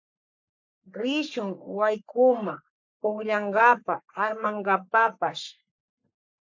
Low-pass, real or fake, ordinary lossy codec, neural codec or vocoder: 7.2 kHz; fake; MP3, 48 kbps; codec, 44.1 kHz, 3.4 kbps, Pupu-Codec